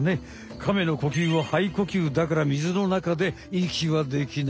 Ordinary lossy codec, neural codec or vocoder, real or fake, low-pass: none; none; real; none